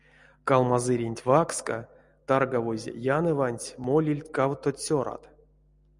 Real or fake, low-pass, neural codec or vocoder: real; 10.8 kHz; none